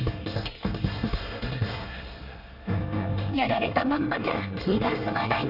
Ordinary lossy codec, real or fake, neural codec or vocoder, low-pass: none; fake; codec, 24 kHz, 1 kbps, SNAC; 5.4 kHz